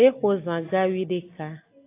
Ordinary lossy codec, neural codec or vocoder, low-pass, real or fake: AAC, 24 kbps; none; 3.6 kHz; real